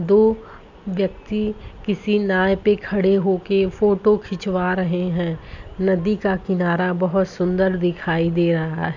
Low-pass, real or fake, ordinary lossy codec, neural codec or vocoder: 7.2 kHz; real; none; none